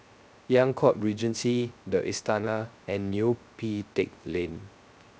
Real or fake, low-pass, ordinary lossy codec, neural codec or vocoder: fake; none; none; codec, 16 kHz, 0.3 kbps, FocalCodec